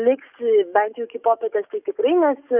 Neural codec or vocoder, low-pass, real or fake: codec, 44.1 kHz, 7.8 kbps, DAC; 3.6 kHz; fake